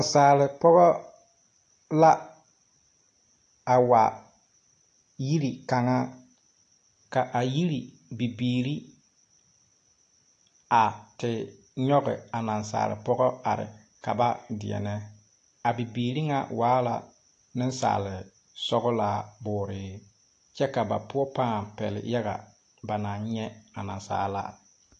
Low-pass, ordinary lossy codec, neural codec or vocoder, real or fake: 9.9 kHz; AAC, 48 kbps; none; real